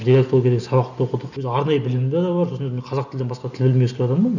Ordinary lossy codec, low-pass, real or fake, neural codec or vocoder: none; 7.2 kHz; real; none